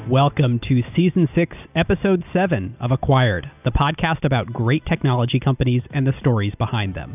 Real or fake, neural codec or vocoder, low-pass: real; none; 3.6 kHz